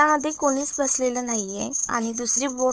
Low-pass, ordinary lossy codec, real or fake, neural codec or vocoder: none; none; fake; codec, 16 kHz, 4 kbps, FunCodec, trained on Chinese and English, 50 frames a second